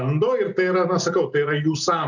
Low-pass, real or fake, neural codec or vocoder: 7.2 kHz; real; none